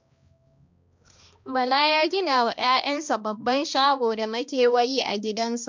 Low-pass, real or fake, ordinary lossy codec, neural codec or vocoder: 7.2 kHz; fake; MP3, 64 kbps; codec, 16 kHz, 1 kbps, X-Codec, HuBERT features, trained on balanced general audio